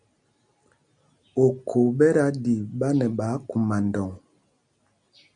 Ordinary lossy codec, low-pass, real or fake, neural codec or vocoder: MP3, 96 kbps; 9.9 kHz; real; none